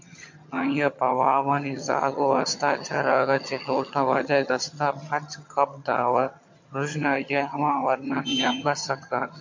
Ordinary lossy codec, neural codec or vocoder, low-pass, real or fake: MP3, 48 kbps; vocoder, 22.05 kHz, 80 mel bands, HiFi-GAN; 7.2 kHz; fake